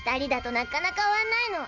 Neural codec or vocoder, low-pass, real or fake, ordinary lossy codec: none; 7.2 kHz; real; none